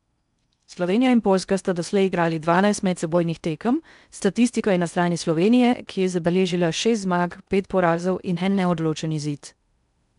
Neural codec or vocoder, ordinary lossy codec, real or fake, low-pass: codec, 16 kHz in and 24 kHz out, 0.6 kbps, FocalCodec, streaming, 4096 codes; none; fake; 10.8 kHz